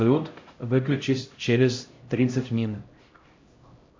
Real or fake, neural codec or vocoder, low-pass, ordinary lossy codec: fake; codec, 16 kHz, 0.5 kbps, X-Codec, HuBERT features, trained on LibriSpeech; 7.2 kHz; MP3, 48 kbps